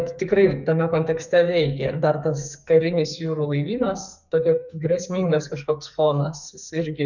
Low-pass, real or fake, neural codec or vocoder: 7.2 kHz; fake; codec, 44.1 kHz, 2.6 kbps, SNAC